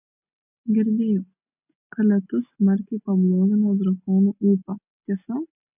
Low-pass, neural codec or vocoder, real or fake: 3.6 kHz; none; real